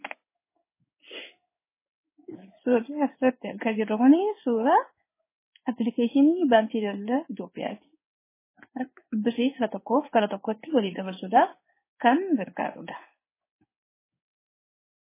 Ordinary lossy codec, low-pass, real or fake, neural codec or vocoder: MP3, 16 kbps; 3.6 kHz; fake; codec, 16 kHz in and 24 kHz out, 1 kbps, XY-Tokenizer